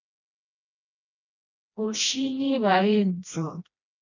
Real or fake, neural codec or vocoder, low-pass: fake; codec, 16 kHz, 1 kbps, FreqCodec, smaller model; 7.2 kHz